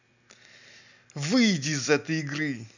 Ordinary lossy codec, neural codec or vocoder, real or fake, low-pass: none; none; real; 7.2 kHz